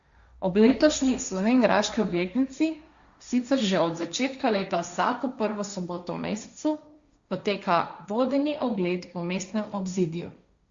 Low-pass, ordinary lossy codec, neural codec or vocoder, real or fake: 7.2 kHz; Opus, 64 kbps; codec, 16 kHz, 1.1 kbps, Voila-Tokenizer; fake